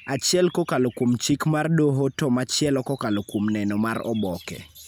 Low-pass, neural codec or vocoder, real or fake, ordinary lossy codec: none; none; real; none